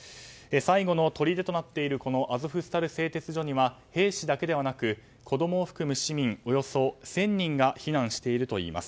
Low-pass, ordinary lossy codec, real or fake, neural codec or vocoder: none; none; real; none